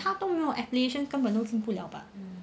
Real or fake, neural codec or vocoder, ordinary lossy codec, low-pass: real; none; none; none